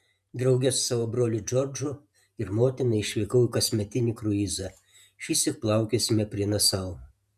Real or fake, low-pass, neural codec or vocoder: fake; 14.4 kHz; vocoder, 48 kHz, 128 mel bands, Vocos